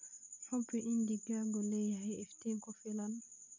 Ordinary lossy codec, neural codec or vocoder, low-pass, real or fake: none; none; 7.2 kHz; real